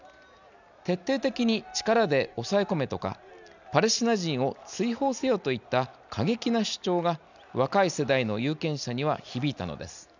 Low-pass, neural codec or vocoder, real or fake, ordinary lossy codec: 7.2 kHz; none; real; none